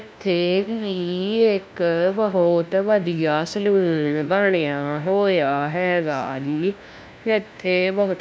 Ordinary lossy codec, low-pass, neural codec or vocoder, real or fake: none; none; codec, 16 kHz, 1 kbps, FunCodec, trained on LibriTTS, 50 frames a second; fake